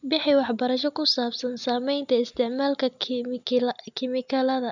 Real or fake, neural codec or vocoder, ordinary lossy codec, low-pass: real; none; none; 7.2 kHz